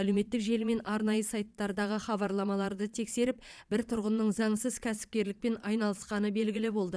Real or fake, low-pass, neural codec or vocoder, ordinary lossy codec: fake; none; vocoder, 22.05 kHz, 80 mel bands, Vocos; none